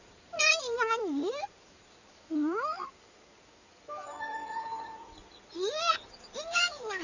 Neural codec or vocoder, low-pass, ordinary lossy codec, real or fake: codec, 16 kHz in and 24 kHz out, 2.2 kbps, FireRedTTS-2 codec; 7.2 kHz; none; fake